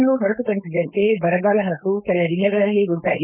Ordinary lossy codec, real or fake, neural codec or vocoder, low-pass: none; fake; codec, 16 kHz, 4.8 kbps, FACodec; 3.6 kHz